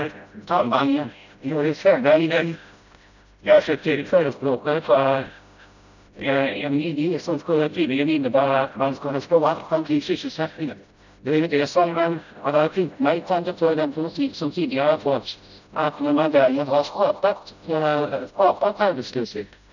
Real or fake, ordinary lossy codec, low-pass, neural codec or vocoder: fake; none; 7.2 kHz; codec, 16 kHz, 0.5 kbps, FreqCodec, smaller model